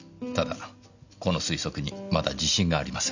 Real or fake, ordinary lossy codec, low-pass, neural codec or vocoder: real; none; 7.2 kHz; none